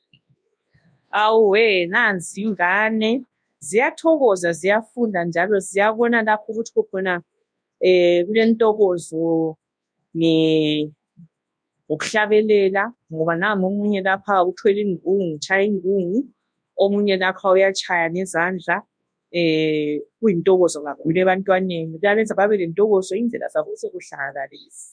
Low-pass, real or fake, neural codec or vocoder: 9.9 kHz; fake; codec, 24 kHz, 0.9 kbps, WavTokenizer, large speech release